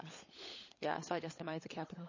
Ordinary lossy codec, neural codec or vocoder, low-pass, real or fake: MP3, 32 kbps; codec, 16 kHz, 16 kbps, FunCodec, trained on LibriTTS, 50 frames a second; 7.2 kHz; fake